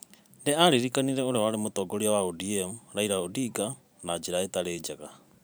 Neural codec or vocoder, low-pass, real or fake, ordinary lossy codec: vocoder, 44.1 kHz, 128 mel bands every 512 samples, BigVGAN v2; none; fake; none